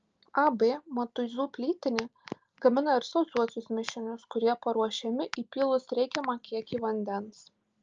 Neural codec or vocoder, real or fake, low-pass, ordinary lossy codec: none; real; 7.2 kHz; Opus, 24 kbps